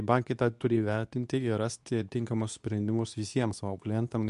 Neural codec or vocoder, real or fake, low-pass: codec, 24 kHz, 0.9 kbps, WavTokenizer, medium speech release version 2; fake; 10.8 kHz